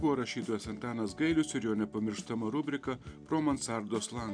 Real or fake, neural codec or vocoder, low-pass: real; none; 9.9 kHz